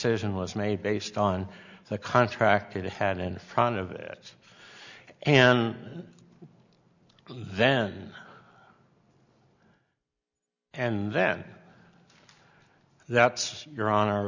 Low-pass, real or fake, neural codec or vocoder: 7.2 kHz; real; none